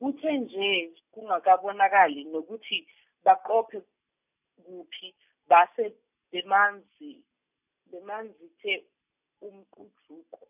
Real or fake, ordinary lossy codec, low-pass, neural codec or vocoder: real; none; 3.6 kHz; none